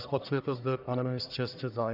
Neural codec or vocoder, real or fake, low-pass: codec, 44.1 kHz, 1.7 kbps, Pupu-Codec; fake; 5.4 kHz